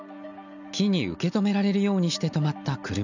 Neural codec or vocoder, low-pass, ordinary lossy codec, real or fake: none; 7.2 kHz; none; real